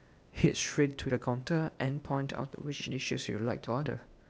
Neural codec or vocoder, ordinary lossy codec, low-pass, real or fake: codec, 16 kHz, 0.8 kbps, ZipCodec; none; none; fake